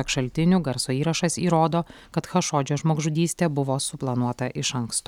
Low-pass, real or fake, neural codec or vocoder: 19.8 kHz; real; none